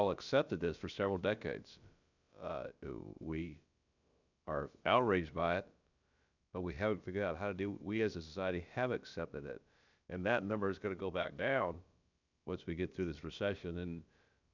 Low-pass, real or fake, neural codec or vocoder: 7.2 kHz; fake; codec, 16 kHz, about 1 kbps, DyCAST, with the encoder's durations